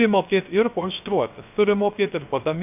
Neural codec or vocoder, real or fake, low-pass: codec, 16 kHz, 0.3 kbps, FocalCodec; fake; 3.6 kHz